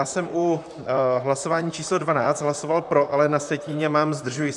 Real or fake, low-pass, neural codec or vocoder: fake; 10.8 kHz; vocoder, 44.1 kHz, 128 mel bands, Pupu-Vocoder